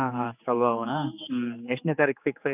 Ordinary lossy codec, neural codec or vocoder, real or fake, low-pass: none; codec, 16 kHz, 4 kbps, X-Codec, HuBERT features, trained on general audio; fake; 3.6 kHz